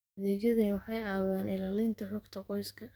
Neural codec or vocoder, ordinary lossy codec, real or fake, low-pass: codec, 44.1 kHz, 2.6 kbps, SNAC; none; fake; none